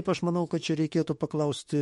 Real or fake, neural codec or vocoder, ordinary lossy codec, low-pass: fake; autoencoder, 48 kHz, 32 numbers a frame, DAC-VAE, trained on Japanese speech; MP3, 48 kbps; 19.8 kHz